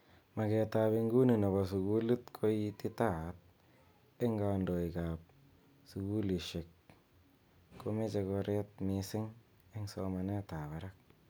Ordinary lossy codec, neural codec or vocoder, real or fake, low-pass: none; none; real; none